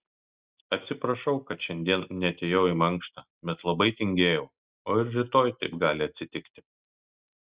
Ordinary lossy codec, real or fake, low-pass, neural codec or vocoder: Opus, 64 kbps; real; 3.6 kHz; none